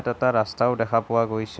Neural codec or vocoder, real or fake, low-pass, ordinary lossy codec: none; real; none; none